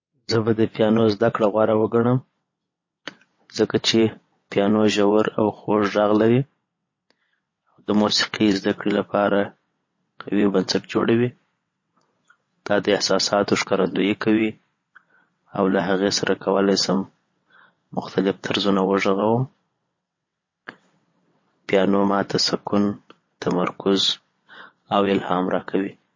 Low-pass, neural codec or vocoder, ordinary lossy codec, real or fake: 7.2 kHz; vocoder, 22.05 kHz, 80 mel bands, WaveNeXt; MP3, 32 kbps; fake